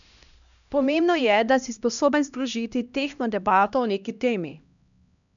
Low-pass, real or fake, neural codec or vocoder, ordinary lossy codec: 7.2 kHz; fake; codec, 16 kHz, 1 kbps, X-Codec, HuBERT features, trained on LibriSpeech; none